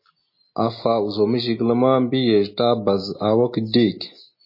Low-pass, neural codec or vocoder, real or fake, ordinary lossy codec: 5.4 kHz; none; real; MP3, 24 kbps